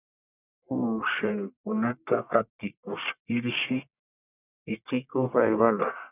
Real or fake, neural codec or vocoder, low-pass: fake; codec, 44.1 kHz, 1.7 kbps, Pupu-Codec; 3.6 kHz